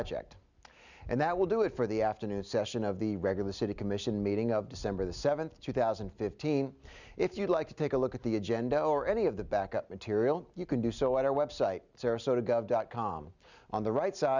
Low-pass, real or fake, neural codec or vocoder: 7.2 kHz; real; none